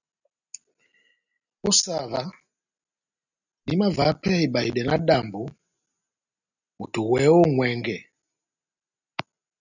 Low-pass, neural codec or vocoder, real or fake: 7.2 kHz; none; real